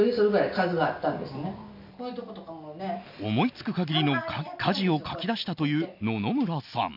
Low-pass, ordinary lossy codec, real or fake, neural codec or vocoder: 5.4 kHz; none; real; none